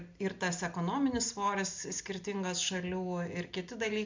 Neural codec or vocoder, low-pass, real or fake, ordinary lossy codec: none; 7.2 kHz; real; MP3, 64 kbps